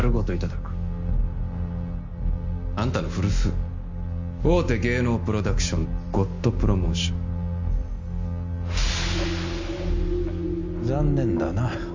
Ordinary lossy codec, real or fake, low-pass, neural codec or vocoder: MP3, 64 kbps; real; 7.2 kHz; none